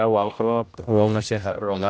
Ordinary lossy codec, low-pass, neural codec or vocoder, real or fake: none; none; codec, 16 kHz, 0.5 kbps, X-Codec, HuBERT features, trained on general audio; fake